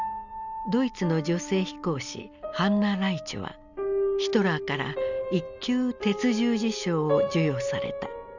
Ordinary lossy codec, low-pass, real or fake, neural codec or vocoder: none; 7.2 kHz; real; none